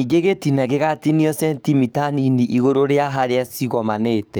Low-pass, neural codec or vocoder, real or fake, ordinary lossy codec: none; codec, 44.1 kHz, 7.8 kbps, DAC; fake; none